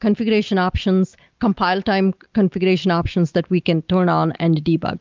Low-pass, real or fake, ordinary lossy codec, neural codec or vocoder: 7.2 kHz; real; Opus, 32 kbps; none